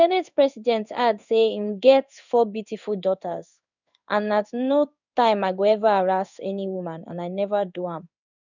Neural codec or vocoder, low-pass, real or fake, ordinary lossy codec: codec, 16 kHz in and 24 kHz out, 1 kbps, XY-Tokenizer; 7.2 kHz; fake; none